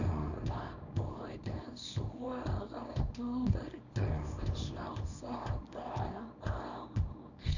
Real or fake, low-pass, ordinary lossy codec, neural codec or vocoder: fake; 7.2 kHz; none; codec, 24 kHz, 0.9 kbps, WavTokenizer, small release